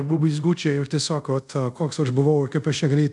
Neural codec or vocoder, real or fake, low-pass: codec, 24 kHz, 0.5 kbps, DualCodec; fake; 10.8 kHz